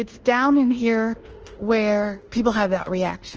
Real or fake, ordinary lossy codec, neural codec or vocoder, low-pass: fake; Opus, 16 kbps; codec, 24 kHz, 1.2 kbps, DualCodec; 7.2 kHz